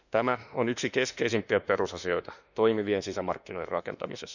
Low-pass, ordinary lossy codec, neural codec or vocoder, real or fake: 7.2 kHz; none; autoencoder, 48 kHz, 32 numbers a frame, DAC-VAE, trained on Japanese speech; fake